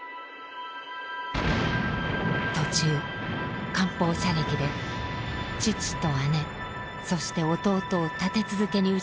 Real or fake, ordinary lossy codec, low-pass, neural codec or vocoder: real; none; none; none